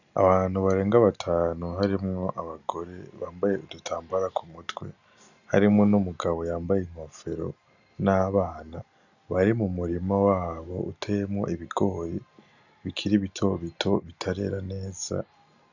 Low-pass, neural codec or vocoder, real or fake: 7.2 kHz; none; real